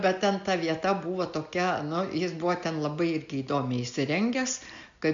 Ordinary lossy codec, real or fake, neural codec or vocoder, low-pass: MP3, 64 kbps; real; none; 7.2 kHz